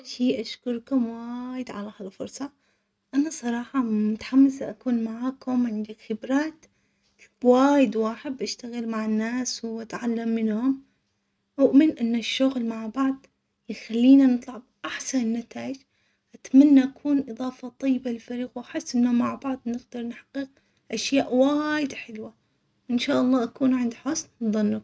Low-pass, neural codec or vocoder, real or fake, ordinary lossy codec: none; none; real; none